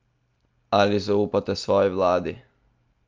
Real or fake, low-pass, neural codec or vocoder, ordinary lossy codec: real; 7.2 kHz; none; Opus, 24 kbps